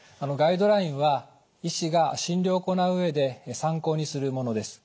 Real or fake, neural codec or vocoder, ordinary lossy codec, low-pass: real; none; none; none